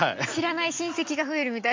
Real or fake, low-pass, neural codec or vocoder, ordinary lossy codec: real; 7.2 kHz; none; MP3, 48 kbps